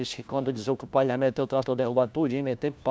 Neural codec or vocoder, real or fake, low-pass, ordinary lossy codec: codec, 16 kHz, 1 kbps, FunCodec, trained on LibriTTS, 50 frames a second; fake; none; none